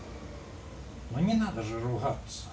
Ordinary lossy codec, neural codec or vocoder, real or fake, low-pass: none; none; real; none